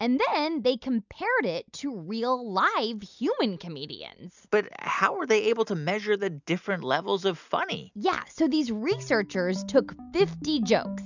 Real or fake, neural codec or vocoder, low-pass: real; none; 7.2 kHz